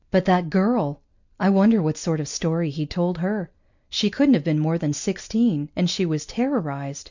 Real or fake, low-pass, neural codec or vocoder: real; 7.2 kHz; none